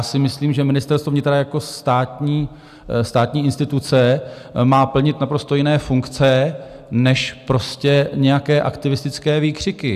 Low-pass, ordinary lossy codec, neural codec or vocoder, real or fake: 14.4 kHz; AAC, 96 kbps; none; real